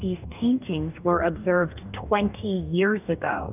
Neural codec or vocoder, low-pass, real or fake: codec, 44.1 kHz, 2.6 kbps, DAC; 3.6 kHz; fake